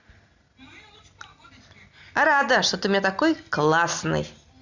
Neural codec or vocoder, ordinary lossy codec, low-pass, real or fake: none; Opus, 64 kbps; 7.2 kHz; real